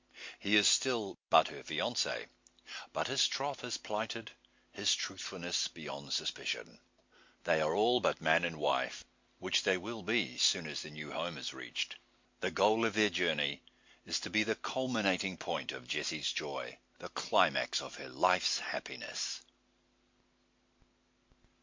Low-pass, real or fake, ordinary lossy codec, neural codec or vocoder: 7.2 kHz; real; MP3, 48 kbps; none